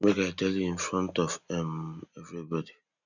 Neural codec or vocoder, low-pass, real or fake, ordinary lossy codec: none; 7.2 kHz; real; none